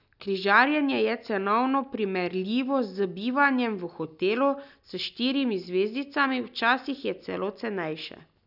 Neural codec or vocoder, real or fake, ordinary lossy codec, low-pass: none; real; none; 5.4 kHz